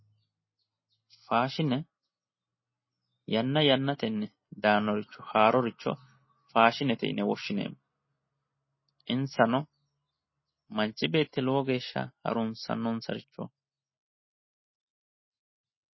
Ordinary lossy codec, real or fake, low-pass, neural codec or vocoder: MP3, 24 kbps; real; 7.2 kHz; none